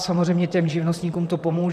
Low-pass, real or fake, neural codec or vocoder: 14.4 kHz; fake; vocoder, 44.1 kHz, 128 mel bands, Pupu-Vocoder